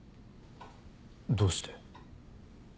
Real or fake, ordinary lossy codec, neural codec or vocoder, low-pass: real; none; none; none